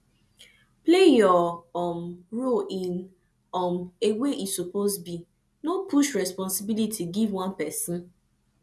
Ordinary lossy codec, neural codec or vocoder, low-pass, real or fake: none; none; none; real